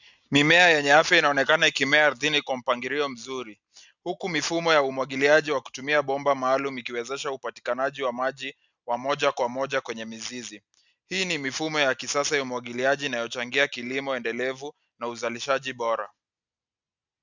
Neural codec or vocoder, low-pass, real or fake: none; 7.2 kHz; real